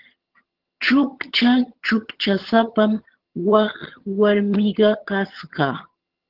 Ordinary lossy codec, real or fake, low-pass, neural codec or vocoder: Opus, 16 kbps; fake; 5.4 kHz; vocoder, 22.05 kHz, 80 mel bands, HiFi-GAN